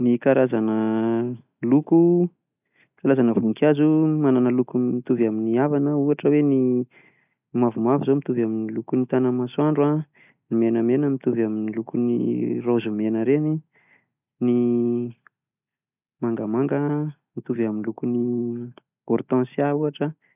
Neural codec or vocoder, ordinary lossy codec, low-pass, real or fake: none; none; 3.6 kHz; real